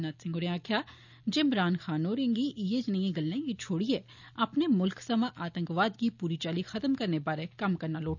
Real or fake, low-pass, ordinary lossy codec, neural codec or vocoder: real; 7.2 kHz; none; none